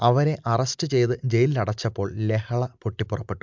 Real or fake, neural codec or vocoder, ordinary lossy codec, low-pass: real; none; MP3, 64 kbps; 7.2 kHz